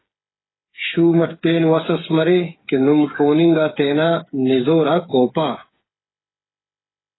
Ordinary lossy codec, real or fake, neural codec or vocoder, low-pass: AAC, 16 kbps; fake; codec, 16 kHz, 8 kbps, FreqCodec, smaller model; 7.2 kHz